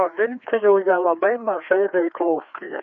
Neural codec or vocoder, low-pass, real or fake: codec, 16 kHz, 2 kbps, FreqCodec, larger model; 7.2 kHz; fake